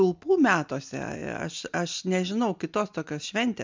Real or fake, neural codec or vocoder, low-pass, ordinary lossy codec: real; none; 7.2 kHz; MP3, 64 kbps